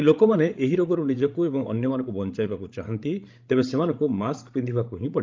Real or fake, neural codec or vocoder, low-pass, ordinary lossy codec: fake; codec, 16 kHz, 16 kbps, FreqCodec, larger model; 7.2 kHz; Opus, 24 kbps